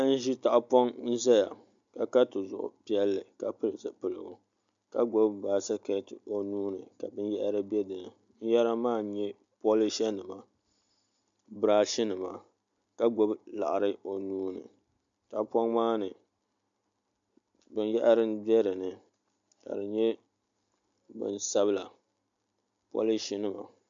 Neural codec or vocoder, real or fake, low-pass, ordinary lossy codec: none; real; 7.2 kHz; MP3, 64 kbps